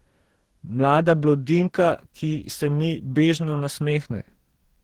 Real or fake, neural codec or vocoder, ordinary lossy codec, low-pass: fake; codec, 44.1 kHz, 2.6 kbps, DAC; Opus, 16 kbps; 19.8 kHz